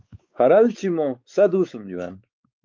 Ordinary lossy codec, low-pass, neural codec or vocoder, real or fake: Opus, 24 kbps; 7.2 kHz; codec, 16 kHz, 4 kbps, X-Codec, WavLM features, trained on Multilingual LibriSpeech; fake